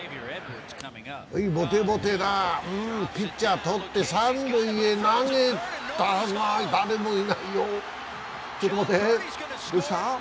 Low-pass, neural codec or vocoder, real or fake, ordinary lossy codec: none; none; real; none